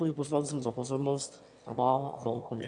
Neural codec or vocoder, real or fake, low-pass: autoencoder, 22.05 kHz, a latent of 192 numbers a frame, VITS, trained on one speaker; fake; 9.9 kHz